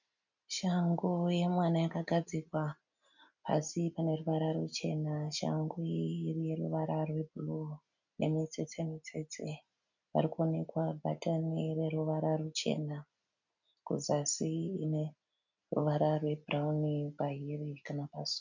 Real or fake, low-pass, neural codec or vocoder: real; 7.2 kHz; none